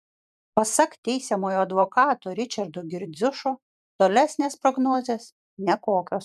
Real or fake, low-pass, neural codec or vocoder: fake; 14.4 kHz; vocoder, 44.1 kHz, 128 mel bands every 512 samples, BigVGAN v2